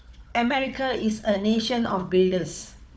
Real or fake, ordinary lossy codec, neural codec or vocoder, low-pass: fake; none; codec, 16 kHz, 4 kbps, FunCodec, trained on Chinese and English, 50 frames a second; none